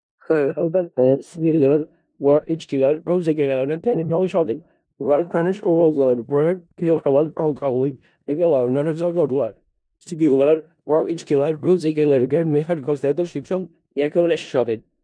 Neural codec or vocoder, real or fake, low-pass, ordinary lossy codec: codec, 16 kHz in and 24 kHz out, 0.4 kbps, LongCat-Audio-Codec, four codebook decoder; fake; 9.9 kHz; none